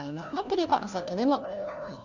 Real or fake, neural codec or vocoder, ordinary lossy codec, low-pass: fake; codec, 16 kHz, 1 kbps, FunCodec, trained on LibriTTS, 50 frames a second; none; 7.2 kHz